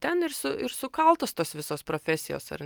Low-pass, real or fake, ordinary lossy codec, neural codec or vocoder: 19.8 kHz; real; Opus, 32 kbps; none